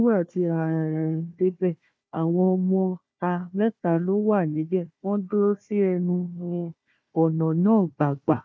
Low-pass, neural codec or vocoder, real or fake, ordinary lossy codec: none; codec, 16 kHz, 1 kbps, FunCodec, trained on Chinese and English, 50 frames a second; fake; none